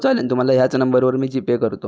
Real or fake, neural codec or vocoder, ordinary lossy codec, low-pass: real; none; none; none